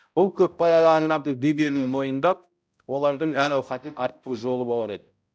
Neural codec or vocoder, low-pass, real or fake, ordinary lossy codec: codec, 16 kHz, 0.5 kbps, X-Codec, HuBERT features, trained on balanced general audio; none; fake; none